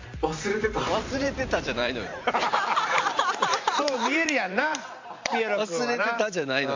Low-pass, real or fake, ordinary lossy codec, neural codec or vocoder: 7.2 kHz; fake; MP3, 48 kbps; vocoder, 44.1 kHz, 128 mel bands every 512 samples, BigVGAN v2